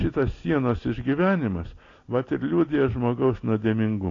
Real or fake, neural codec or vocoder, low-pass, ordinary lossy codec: real; none; 7.2 kHz; AAC, 32 kbps